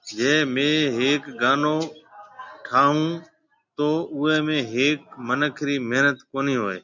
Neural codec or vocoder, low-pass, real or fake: none; 7.2 kHz; real